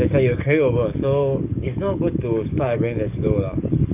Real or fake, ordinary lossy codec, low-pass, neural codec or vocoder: fake; none; 3.6 kHz; codec, 44.1 kHz, 7.8 kbps, DAC